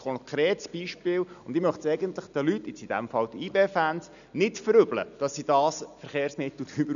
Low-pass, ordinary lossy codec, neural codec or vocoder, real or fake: 7.2 kHz; none; none; real